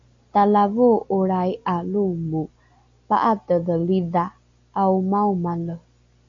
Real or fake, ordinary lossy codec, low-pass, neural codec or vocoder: real; MP3, 64 kbps; 7.2 kHz; none